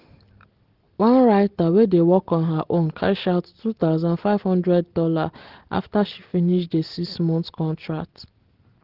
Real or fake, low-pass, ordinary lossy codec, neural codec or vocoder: real; 5.4 kHz; Opus, 16 kbps; none